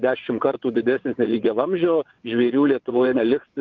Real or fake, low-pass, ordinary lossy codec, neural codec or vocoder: fake; 7.2 kHz; Opus, 32 kbps; vocoder, 22.05 kHz, 80 mel bands, WaveNeXt